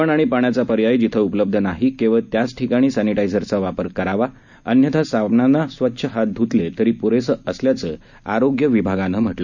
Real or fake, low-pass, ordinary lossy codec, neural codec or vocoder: real; 7.2 kHz; none; none